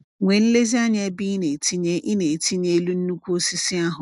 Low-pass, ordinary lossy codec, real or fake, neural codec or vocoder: 10.8 kHz; MP3, 96 kbps; real; none